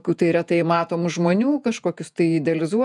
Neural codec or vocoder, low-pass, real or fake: vocoder, 48 kHz, 128 mel bands, Vocos; 10.8 kHz; fake